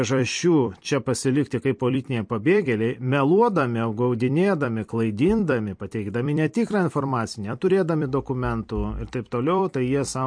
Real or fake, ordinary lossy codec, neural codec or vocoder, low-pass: fake; MP3, 48 kbps; vocoder, 44.1 kHz, 128 mel bands every 256 samples, BigVGAN v2; 9.9 kHz